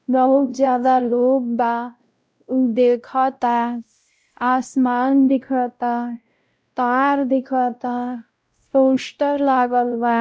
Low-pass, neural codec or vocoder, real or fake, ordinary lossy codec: none; codec, 16 kHz, 0.5 kbps, X-Codec, WavLM features, trained on Multilingual LibriSpeech; fake; none